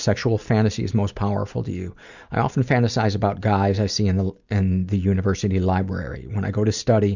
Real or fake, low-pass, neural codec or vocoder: real; 7.2 kHz; none